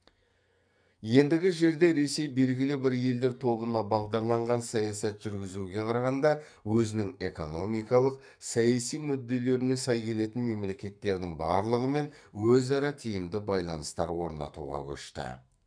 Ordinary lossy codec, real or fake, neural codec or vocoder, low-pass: none; fake; codec, 44.1 kHz, 2.6 kbps, SNAC; 9.9 kHz